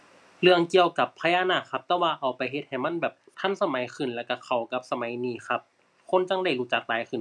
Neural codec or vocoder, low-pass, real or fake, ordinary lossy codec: none; none; real; none